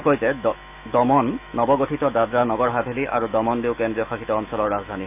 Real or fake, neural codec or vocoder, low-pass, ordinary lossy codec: fake; autoencoder, 48 kHz, 128 numbers a frame, DAC-VAE, trained on Japanese speech; 3.6 kHz; none